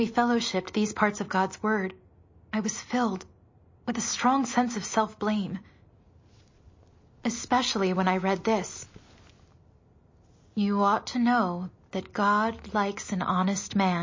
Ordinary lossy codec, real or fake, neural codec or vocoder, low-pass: MP3, 32 kbps; real; none; 7.2 kHz